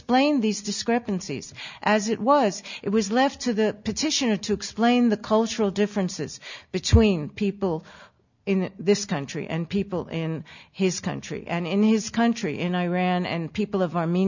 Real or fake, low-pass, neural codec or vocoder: real; 7.2 kHz; none